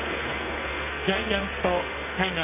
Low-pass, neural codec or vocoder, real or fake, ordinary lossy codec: 3.6 kHz; codec, 44.1 kHz, 2.6 kbps, SNAC; fake; none